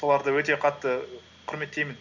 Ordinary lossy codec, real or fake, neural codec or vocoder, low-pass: none; real; none; 7.2 kHz